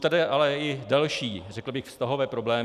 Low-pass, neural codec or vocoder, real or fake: 14.4 kHz; none; real